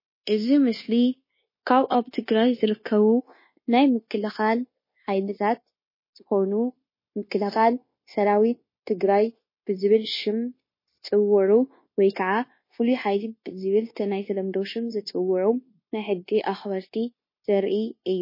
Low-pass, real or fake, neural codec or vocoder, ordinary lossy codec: 5.4 kHz; fake; codec, 16 kHz, 0.9 kbps, LongCat-Audio-Codec; MP3, 24 kbps